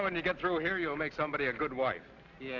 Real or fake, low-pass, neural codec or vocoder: real; 7.2 kHz; none